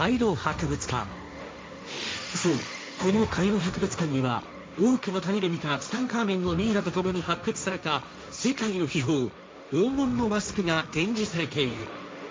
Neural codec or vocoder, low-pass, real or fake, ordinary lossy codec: codec, 16 kHz, 1.1 kbps, Voila-Tokenizer; none; fake; none